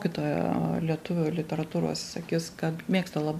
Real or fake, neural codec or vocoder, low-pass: real; none; 14.4 kHz